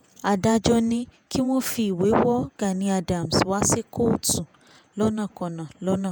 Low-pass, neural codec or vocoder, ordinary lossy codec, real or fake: none; vocoder, 48 kHz, 128 mel bands, Vocos; none; fake